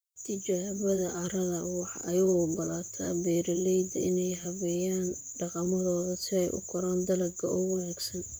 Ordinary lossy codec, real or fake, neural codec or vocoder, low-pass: none; fake; vocoder, 44.1 kHz, 128 mel bands, Pupu-Vocoder; none